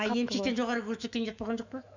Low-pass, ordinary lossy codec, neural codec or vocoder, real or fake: 7.2 kHz; none; autoencoder, 48 kHz, 128 numbers a frame, DAC-VAE, trained on Japanese speech; fake